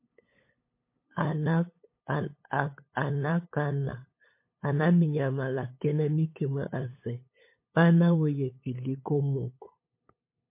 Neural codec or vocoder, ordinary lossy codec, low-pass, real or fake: codec, 16 kHz, 8 kbps, FunCodec, trained on LibriTTS, 25 frames a second; MP3, 24 kbps; 3.6 kHz; fake